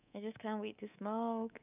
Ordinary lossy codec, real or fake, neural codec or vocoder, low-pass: none; fake; codec, 24 kHz, 3.1 kbps, DualCodec; 3.6 kHz